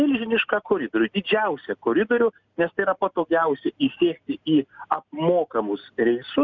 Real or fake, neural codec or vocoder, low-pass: real; none; 7.2 kHz